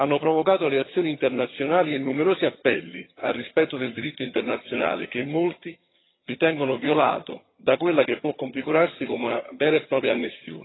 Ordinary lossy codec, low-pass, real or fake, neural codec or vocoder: AAC, 16 kbps; 7.2 kHz; fake; vocoder, 22.05 kHz, 80 mel bands, HiFi-GAN